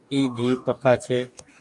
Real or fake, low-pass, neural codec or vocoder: fake; 10.8 kHz; codec, 44.1 kHz, 2.6 kbps, DAC